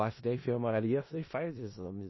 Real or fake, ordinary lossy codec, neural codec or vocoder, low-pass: fake; MP3, 24 kbps; codec, 16 kHz in and 24 kHz out, 0.4 kbps, LongCat-Audio-Codec, four codebook decoder; 7.2 kHz